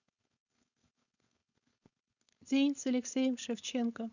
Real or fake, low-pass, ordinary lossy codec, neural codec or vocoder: fake; 7.2 kHz; none; codec, 16 kHz, 4.8 kbps, FACodec